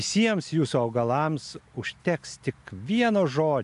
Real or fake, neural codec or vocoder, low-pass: real; none; 10.8 kHz